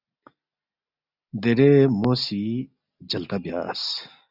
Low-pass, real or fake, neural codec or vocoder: 5.4 kHz; real; none